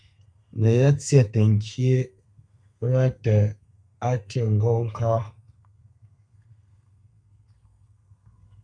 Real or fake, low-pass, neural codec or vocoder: fake; 9.9 kHz; codec, 32 kHz, 1.9 kbps, SNAC